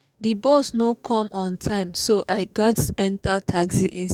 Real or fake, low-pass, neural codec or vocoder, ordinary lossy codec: fake; 19.8 kHz; codec, 44.1 kHz, 2.6 kbps, DAC; none